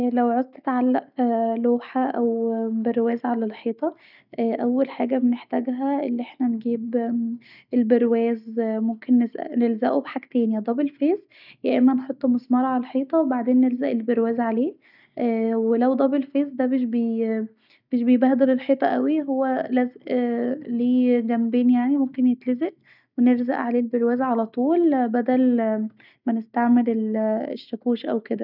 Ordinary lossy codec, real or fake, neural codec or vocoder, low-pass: none; real; none; 5.4 kHz